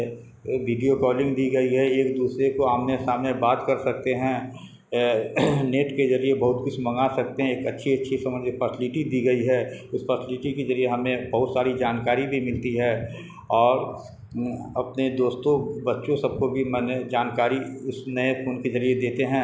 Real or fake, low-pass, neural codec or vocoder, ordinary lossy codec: real; none; none; none